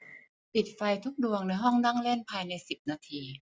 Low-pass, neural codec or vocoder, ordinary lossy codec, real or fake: none; none; none; real